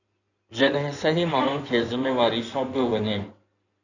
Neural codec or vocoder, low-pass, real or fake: codec, 16 kHz in and 24 kHz out, 2.2 kbps, FireRedTTS-2 codec; 7.2 kHz; fake